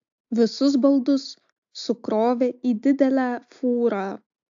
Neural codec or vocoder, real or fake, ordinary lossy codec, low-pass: none; real; AAC, 64 kbps; 7.2 kHz